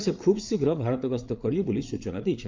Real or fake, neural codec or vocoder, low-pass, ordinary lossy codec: fake; codec, 16 kHz, 8 kbps, FreqCodec, larger model; 7.2 kHz; Opus, 24 kbps